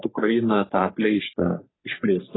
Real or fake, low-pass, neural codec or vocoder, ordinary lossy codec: fake; 7.2 kHz; codec, 44.1 kHz, 3.4 kbps, Pupu-Codec; AAC, 16 kbps